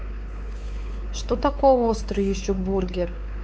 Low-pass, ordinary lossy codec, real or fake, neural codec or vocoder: none; none; fake; codec, 16 kHz, 4 kbps, X-Codec, WavLM features, trained on Multilingual LibriSpeech